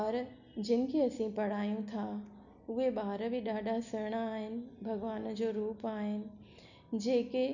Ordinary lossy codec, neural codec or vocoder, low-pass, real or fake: none; none; 7.2 kHz; real